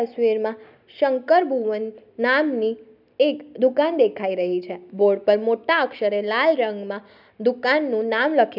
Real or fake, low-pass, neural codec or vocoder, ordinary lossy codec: real; 5.4 kHz; none; none